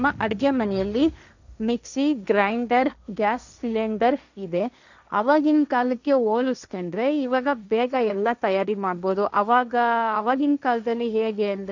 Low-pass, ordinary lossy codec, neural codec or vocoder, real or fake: 7.2 kHz; none; codec, 16 kHz, 1.1 kbps, Voila-Tokenizer; fake